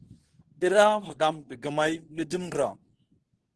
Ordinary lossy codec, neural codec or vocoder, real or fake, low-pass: Opus, 16 kbps; codec, 24 kHz, 0.9 kbps, WavTokenizer, medium speech release version 2; fake; 10.8 kHz